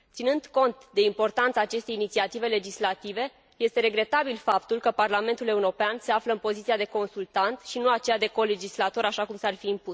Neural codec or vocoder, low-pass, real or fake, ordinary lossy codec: none; none; real; none